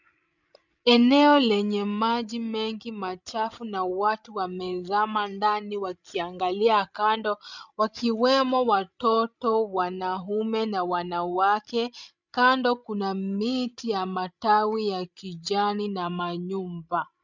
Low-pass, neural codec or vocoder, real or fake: 7.2 kHz; codec, 16 kHz, 16 kbps, FreqCodec, larger model; fake